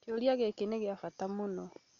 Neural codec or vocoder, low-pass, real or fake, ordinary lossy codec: none; 7.2 kHz; real; none